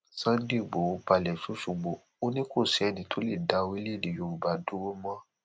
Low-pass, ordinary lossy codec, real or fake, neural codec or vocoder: none; none; real; none